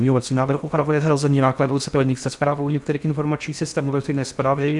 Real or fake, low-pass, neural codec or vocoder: fake; 10.8 kHz; codec, 16 kHz in and 24 kHz out, 0.6 kbps, FocalCodec, streaming, 4096 codes